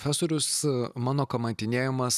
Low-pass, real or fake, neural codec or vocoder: 14.4 kHz; real; none